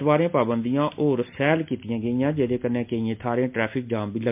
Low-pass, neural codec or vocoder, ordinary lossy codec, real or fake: 3.6 kHz; none; MP3, 32 kbps; real